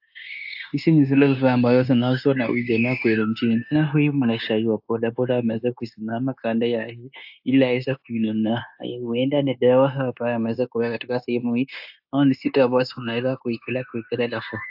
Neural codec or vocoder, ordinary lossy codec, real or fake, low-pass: codec, 16 kHz, 0.9 kbps, LongCat-Audio-Codec; AAC, 48 kbps; fake; 5.4 kHz